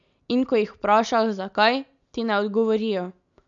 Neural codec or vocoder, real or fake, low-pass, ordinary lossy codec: none; real; 7.2 kHz; MP3, 96 kbps